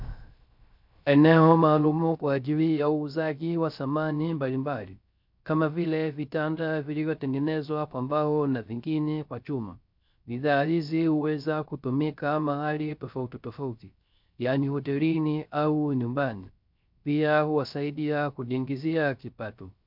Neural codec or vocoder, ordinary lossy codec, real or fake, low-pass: codec, 16 kHz, 0.3 kbps, FocalCodec; MP3, 48 kbps; fake; 5.4 kHz